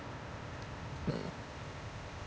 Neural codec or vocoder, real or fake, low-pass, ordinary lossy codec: codec, 16 kHz, 0.8 kbps, ZipCodec; fake; none; none